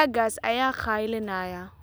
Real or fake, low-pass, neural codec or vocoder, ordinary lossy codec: real; none; none; none